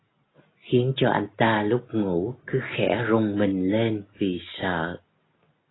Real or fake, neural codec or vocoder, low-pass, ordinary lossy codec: real; none; 7.2 kHz; AAC, 16 kbps